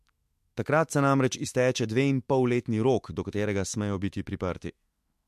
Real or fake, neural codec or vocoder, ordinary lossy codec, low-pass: fake; autoencoder, 48 kHz, 128 numbers a frame, DAC-VAE, trained on Japanese speech; MP3, 64 kbps; 14.4 kHz